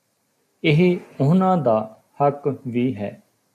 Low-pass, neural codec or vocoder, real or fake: 14.4 kHz; none; real